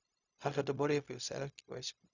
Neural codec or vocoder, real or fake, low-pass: codec, 16 kHz, 0.4 kbps, LongCat-Audio-Codec; fake; 7.2 kHz